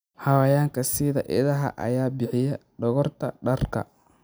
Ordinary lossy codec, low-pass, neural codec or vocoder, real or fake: none; none; none; real